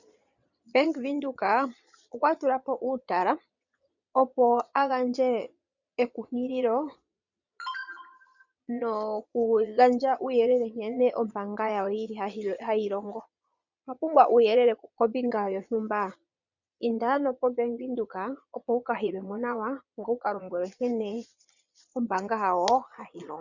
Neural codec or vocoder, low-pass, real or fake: vocoder, 22.05 kHz, 80 mel bands, Vocos; 7.2 kHz; fake